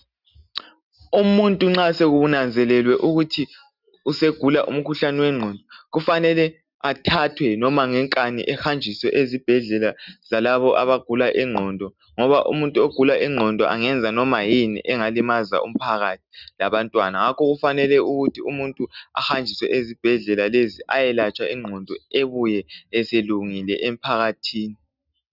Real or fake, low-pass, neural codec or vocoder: real; 5.4 kHz; none